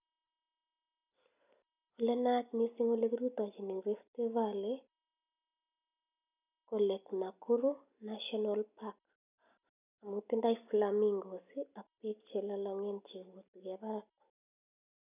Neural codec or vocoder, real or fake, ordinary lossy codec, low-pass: none; real; none; 3.6 kHz